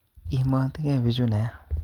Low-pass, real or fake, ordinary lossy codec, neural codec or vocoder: 19.8 kHz; real; Opus, 32 kbps; none